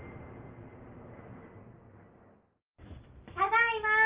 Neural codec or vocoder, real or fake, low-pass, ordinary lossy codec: none; real; 3.6 kHz; Opus, 24 kbps